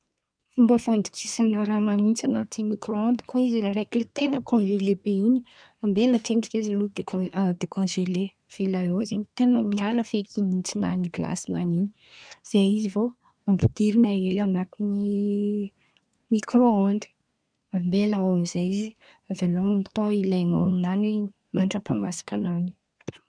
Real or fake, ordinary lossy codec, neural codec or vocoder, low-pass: fake; none; codec, 24 kHz, 1 kbps, SNAC; 9.9 kHz